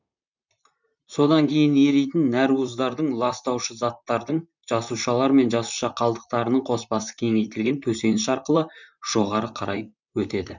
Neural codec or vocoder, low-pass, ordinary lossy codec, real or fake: vocoder, 44.1 kHz, 128 mel bands, Pupu-Vocoder; 7.2 kHz; none; fake